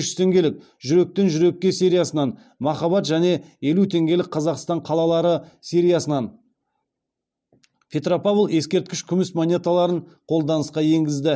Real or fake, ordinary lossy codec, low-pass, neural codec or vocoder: real; none; none; none